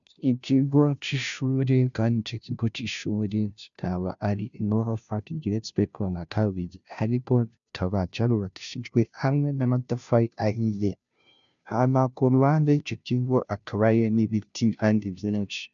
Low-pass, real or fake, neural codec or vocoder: 7.2 kHz; fake; codec, 16 kHz, 0.5 kbps, FunCodec, trained on Chinese and English, 25 frames a second